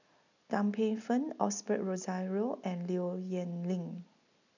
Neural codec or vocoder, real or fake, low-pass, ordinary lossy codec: none; real; 7.2 kHz; none